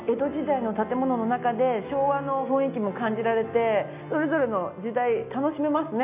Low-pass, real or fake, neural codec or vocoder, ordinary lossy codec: 3.6 kHz; real; none; none